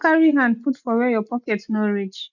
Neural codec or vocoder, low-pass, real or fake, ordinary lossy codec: none; 7.2 kHz; real; none